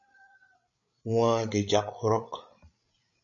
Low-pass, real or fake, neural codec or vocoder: 7.2 kHz; fake; codec, 16 kHz, 8 kbps, FreqCodec, larger model